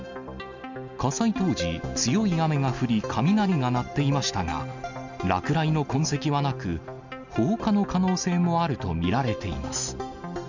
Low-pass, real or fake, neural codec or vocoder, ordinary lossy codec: 7.2 kHz; real; none; none